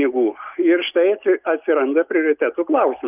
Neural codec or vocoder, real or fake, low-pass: none; real; 3.6 kHz